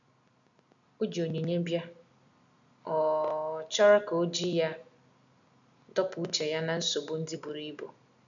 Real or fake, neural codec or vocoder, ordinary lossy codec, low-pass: real; none; none; 7.2 kHz